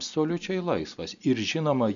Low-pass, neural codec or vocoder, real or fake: 7.2 kHz; none; real